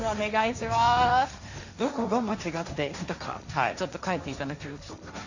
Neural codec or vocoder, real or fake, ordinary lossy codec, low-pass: codec, 16 kHz, 1.1 kbps, Voila-Tokenizer; fake; none; 7.2 kHz